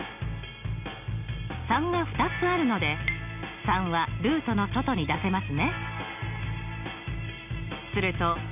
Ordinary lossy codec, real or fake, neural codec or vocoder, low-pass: none; real; none; 3.6 kHz